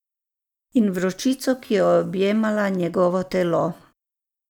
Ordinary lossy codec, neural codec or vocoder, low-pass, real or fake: none; none; 19.8 kHz; real